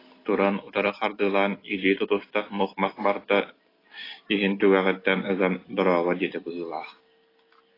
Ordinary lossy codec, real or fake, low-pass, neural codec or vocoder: AAC, 24 kbps; real; 5.4 kHz; none